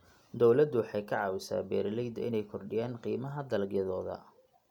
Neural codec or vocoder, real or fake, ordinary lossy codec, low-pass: none; real; none; 19.8 kHz